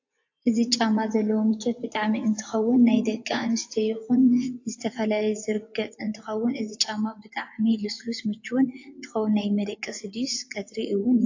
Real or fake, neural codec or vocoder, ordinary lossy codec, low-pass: fake; vocoder, 24 kHz, 100 mel bands, Vocos; AAC, 48 kbps; 7.2 kHz